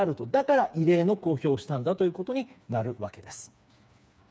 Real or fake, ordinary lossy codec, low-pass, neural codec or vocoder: fake; none; none; codec, 16 kHz, 4 kbps, FreqCodec, smaller model